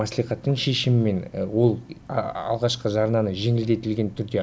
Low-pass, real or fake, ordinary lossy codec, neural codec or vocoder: none; real; none; none